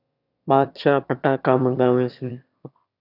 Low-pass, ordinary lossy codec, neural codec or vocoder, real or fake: 5.4 kHz; AAC, 48 kbps; autoencoder, 22.05 kHz, a latent of 192 numbers a frame, VITS, trained on one speaker; fake